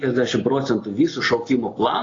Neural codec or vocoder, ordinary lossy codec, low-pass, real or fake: none; AAC, 32 kbps; 7.2 kHz; real